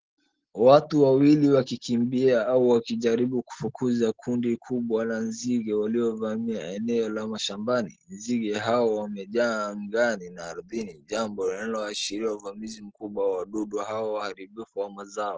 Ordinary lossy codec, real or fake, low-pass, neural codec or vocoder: Opus, 16 kbps; real; 7.2 kHz; none